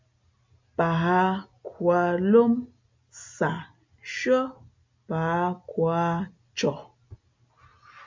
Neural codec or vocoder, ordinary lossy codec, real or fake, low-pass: none; MP3, 64 kbps; real; 7.2 kHz